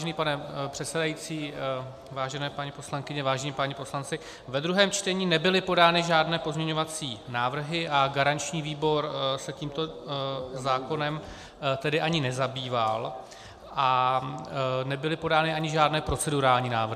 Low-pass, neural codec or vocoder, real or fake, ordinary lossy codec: 14.4 kHz; none; real; MP3, 96 kbps